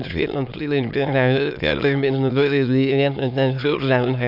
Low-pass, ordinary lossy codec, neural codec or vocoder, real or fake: 5.4 kHz; none; autoencoder, 22.05 kHz, a latent of 192 numbers a frame, VITS, trained on many speakers; fake